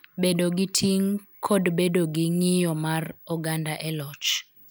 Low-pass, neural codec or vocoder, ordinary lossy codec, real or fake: none; none; none; real